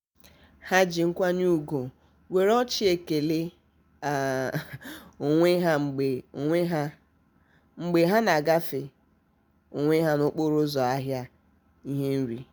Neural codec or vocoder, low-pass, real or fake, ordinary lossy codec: none; none; real; none